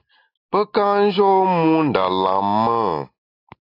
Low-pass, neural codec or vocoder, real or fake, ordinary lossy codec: 5.4 kHz; none; real; AAC, 32 kbps